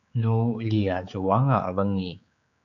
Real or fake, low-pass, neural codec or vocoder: fake; 7.2 kHz; codec, 16 kHz, 4 kbps, X-Codec, HuBERT features, trained on general audio